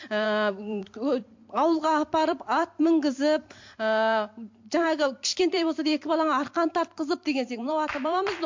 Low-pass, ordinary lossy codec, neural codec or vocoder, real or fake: 7.2 kHz; MP3, 48 kbps; vocoder, 44.1 kHz, 128 mel bands every 256 samples, BigVGAN v2; fake